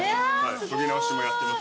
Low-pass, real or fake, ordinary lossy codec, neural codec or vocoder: none; real; none; none